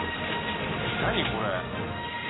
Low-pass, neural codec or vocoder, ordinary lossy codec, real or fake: 7.2 kHz; none; AAC, 16 kbps; real